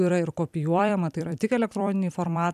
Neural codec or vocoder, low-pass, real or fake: vocoder, 44.1 kHz, 128 mel bands every 256 samples, BigVGAN v2; 14.4 kHz; fake